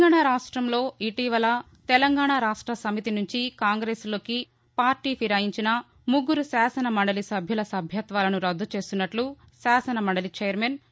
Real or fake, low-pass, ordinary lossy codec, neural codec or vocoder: real; none; none; none